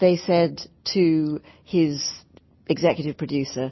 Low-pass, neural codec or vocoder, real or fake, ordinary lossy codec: 7.2 kHz; none; real; MP3, 24 kbps